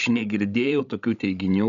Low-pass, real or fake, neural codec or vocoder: 7.2 kHz; fake; codec, 16 kHz, 16 kbps, FreqCodec, larger model